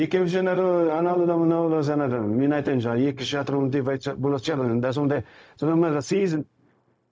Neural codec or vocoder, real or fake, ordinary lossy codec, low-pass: codec, 16 kHz, 0.4 kbps, LongCat-Audio-Codec; fake; none; none